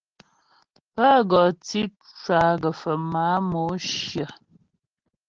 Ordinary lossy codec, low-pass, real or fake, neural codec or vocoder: Opus, 16 kbps; 7.2 kHz; real; none